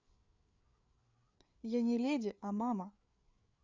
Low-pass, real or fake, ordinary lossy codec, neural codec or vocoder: 7.2 kHz; fake; Opus, 64 kbps; codec, 16 kHz, 4 kbps, FreqCodec, larger model